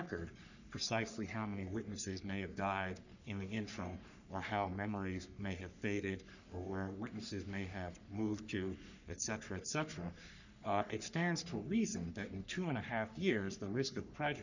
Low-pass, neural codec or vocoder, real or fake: 7.2 kHz; codec, 44.1 kHz, 3.4 kbps, Pupu-Codec; fake